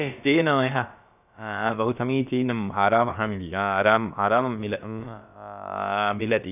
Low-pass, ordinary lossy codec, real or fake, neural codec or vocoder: 3.6 kHz; none; fake; codec, 16 kHz, about 1 kbps, DyCAST, with the encoder's durations